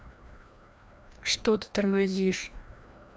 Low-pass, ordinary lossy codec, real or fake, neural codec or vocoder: none; none; fake; codec, 16 kHz, 1 kbps, FreqCodec, larger model